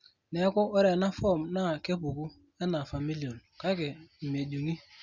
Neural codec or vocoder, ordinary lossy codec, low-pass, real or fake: none; Opus, 64 kbps; 7.2 kHz; real